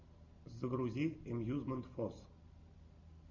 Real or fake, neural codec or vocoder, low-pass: real; none; 7.2 kHz